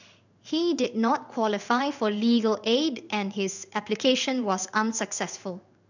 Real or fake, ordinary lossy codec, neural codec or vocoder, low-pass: fake; none; codec, 16 kHz in and 24 kHz out, 1 kbps, XY-Tokenizer; 7.2 kHz